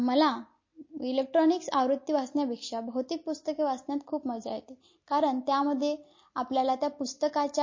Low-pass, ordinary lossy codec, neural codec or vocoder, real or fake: 7.2 kHz; MP3, 32 kbps; none; real